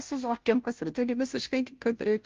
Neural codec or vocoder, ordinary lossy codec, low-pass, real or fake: codec, 16 kHz, 0.5 kbps, FunCodec, trained on Chinese and English, 25 frames a second; Opus, 32 kbps; 7.2 kHz; fake